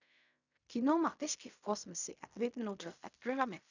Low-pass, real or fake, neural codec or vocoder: 7.2 kHz; fake; codec, 16 kHz in and 24 kHz out, 0.4 kbps, LongCat-Audio-Codec, fine tuned four codebook decoder